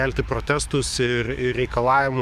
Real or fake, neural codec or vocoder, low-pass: fake; codec, 44.1 kHz, 7.8 kbps, DAC; 14.4 kHz